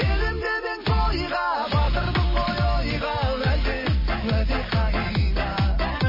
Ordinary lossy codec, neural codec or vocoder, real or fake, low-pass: MP3, 24 kbps; none; real; 5.4 kHz